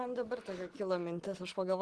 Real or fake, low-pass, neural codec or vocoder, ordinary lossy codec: real; 10.8 kHz; none; Opus, 16 kbps